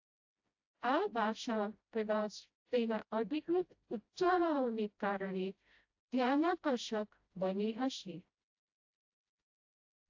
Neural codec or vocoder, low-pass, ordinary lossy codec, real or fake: codec, 16 kHz, 0.5 kbps, FreqCodec, smaller model; 7.2 kHz; AAC, 48 kbps; fake